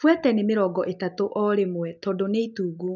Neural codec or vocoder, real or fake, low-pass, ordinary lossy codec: none; real; 7.2 kHz; none